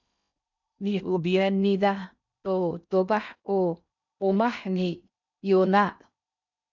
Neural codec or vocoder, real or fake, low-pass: codec, 16 kHz in and 24 kHz out, 0.6 kbps, FocalCodec, streaming, 4096 codes; fake; 7.2 kHz